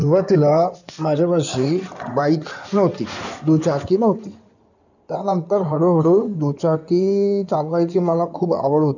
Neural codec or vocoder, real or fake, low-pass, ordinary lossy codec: codec, 16 kHz in and 24 kHz out, 2.2 kbps, FireRedTTS-2 codec; fake; 7.2 kHz; none